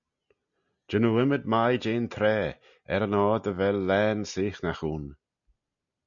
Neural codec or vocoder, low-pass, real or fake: none; 7.2 kHz; real